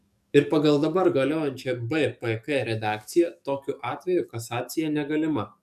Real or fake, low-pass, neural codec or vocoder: fake; 14.4 kHz; codec, 44.1 kHz, 7.8 kbps, DAC